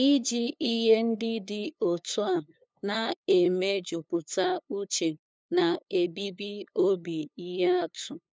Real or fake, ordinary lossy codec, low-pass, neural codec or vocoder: fake; none; none; codec, 16 kHz, 2 kbps, FunCodec, trained on LibriTTS, 25 frames a second